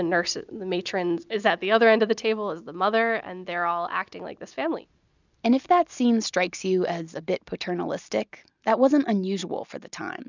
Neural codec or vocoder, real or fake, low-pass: none; real; 7.2 kHz